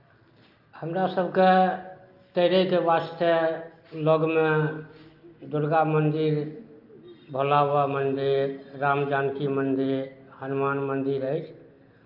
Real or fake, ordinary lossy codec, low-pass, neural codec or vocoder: real; Opus, 24 kbps; 5.4 kHz; none